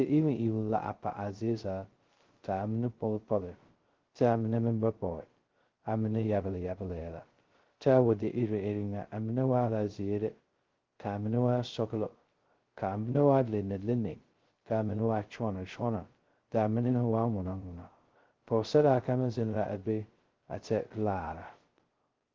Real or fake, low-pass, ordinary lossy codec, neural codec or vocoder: fake; 7.2 kHz; Opus, 16 kbps; codec, 16 kHz, 0.2 kbps, FocalCodec